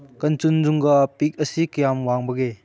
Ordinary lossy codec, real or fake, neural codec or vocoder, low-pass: none; real; none; none